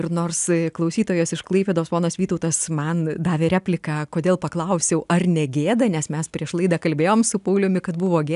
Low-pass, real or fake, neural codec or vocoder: 10.8 kHz; real; none